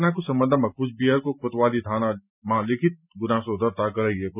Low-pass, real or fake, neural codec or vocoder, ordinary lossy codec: 3.6 kHz; real; none; none